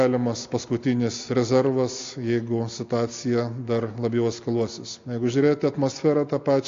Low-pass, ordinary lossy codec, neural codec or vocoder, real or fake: 7.2 kHz; AAC, 48 kbps; none; real